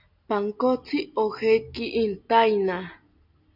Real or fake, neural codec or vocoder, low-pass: real; none; 5.4 kHz